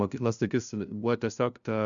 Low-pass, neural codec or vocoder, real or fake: 7.2 kHz; codec, 16 kHz, 0.5 kbps, FunCodec, trained on LibriTTS, 25 frames a second; fake